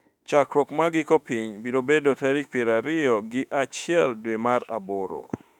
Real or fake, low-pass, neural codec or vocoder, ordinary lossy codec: fake; 19.8 kHz; autoencoder, 48 kHz, 32 numbers a frame, DAC-VAE, trained on Japanese speech; none